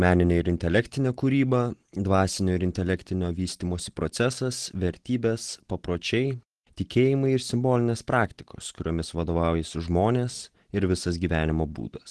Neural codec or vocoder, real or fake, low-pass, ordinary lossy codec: none; real; 10.8 kHz; Opus, 16 kbps